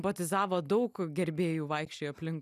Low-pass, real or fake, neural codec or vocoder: 14.4 kHz; real; none